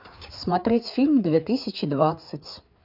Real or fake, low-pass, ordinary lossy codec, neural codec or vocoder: fake; 5.4 kHz; none; codec, 16 kHz, 4 kbps, FreqCodec, larger model